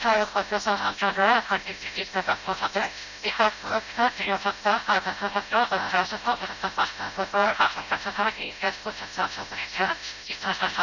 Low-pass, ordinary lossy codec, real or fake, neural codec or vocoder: 7.2 kHz; none; fake; codec, 16 kHz, 0.5 kbps, FreqCodec, smaller model